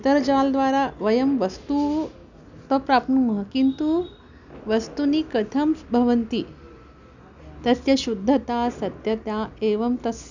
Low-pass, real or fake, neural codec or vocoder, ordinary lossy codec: 7.2 kHz; real; none; none